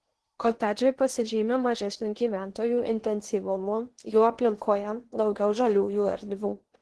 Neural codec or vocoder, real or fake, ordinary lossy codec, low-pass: codec, 16 kHz in and 24 kHz out, 0.8 kbps, FocalCodec, streaming, 65536 codes; fake; Opus, 16 kbps; 10.8 kHz